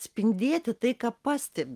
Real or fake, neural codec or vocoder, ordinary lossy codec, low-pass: real; none; Opus, 24 kbps; 14.4 kHz